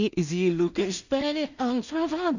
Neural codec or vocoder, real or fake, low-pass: codec, 16 kHz in and 24 kHz out, 0.4 kbps, LongCat-Audio-Codec, two codebook decoder; fake; 7.2 kHz